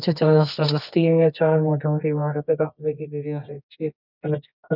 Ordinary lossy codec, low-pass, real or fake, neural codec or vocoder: none; 5.4 kHz; fake; codec, 24 kHz, 0.9 kbps, WavTokenizer, medium music audio release